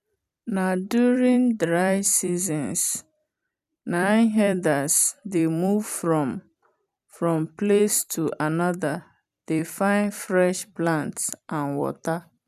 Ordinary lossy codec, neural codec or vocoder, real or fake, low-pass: none; vocoder, 44.1 kHz, 128 mel bands every 256 samples, BigVGAN v2; fake; 14.4 kHz